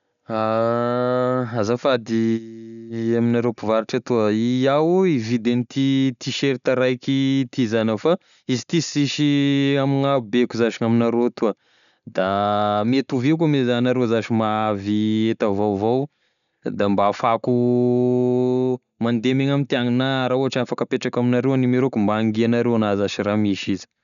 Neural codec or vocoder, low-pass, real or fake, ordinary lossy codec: none; 7.2 kHz; real; none